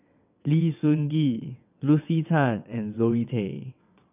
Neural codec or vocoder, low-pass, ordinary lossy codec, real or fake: vocoder, 22.05 kHz, 80 mel bands, WaveNeXt; 3.6 kHz; none; fake